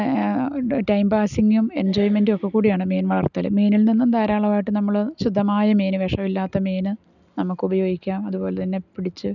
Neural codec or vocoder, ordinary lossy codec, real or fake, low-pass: none; none; real; 7.2 kHz